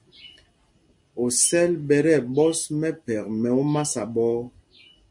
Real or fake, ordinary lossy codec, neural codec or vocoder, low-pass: real; MP3, 48 kbps; none; 10.8 kHz